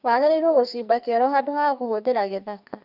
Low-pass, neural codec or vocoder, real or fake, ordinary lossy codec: 5.4 kHz; codec, 44.1 kHz, 2.6 kbps, SNAC; fake; none